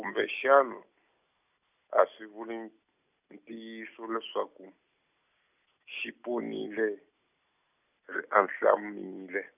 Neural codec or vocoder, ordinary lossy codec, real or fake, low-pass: none; none; real; 3.6 kHz